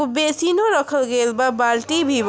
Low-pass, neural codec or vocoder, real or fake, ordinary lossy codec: none; none; real; none